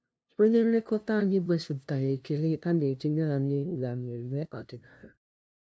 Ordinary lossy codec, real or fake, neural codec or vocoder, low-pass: none; fake; codec, 16 kHz, 0.5 kbps, FunCodec, trained on LibriTTS, 25 frames a second; none